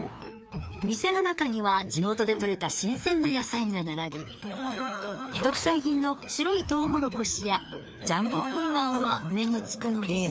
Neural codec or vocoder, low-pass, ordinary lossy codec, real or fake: codec, 16 kHz, 2 kbps, FreqCodec, larger model; none; none; fake